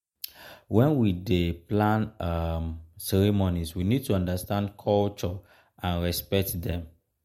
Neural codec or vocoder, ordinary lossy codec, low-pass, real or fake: none; MP3, 64 kbps; 19.8 kHz; real